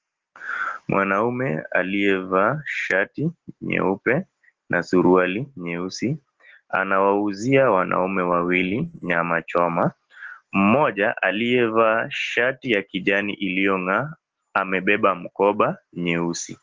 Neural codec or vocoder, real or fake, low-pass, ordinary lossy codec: none; real; 7.2 kHz; Opus, 16 kbps